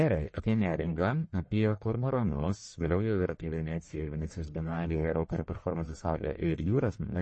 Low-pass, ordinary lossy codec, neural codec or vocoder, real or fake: 10.8 kHz; MP3, 32 kbps; codec, 44.1 kHz, 1.7 kbps, Pupu-Codec; fake